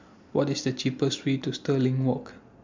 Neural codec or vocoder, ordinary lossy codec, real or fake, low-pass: none; MP3, 64 kbps; real; 7.2 kHz